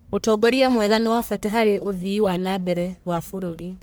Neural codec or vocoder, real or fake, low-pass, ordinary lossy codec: codec, 44.1 kHz, 1.7 kbps, Pupu-Codec; fake; none; none